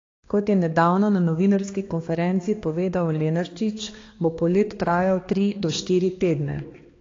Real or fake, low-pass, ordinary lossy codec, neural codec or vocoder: fake; 7.2 kHz; AAC, 32 kbps; codec, 16 kHz, 2 kbps, X-Codec, HuBERT features, trained on balanced general audio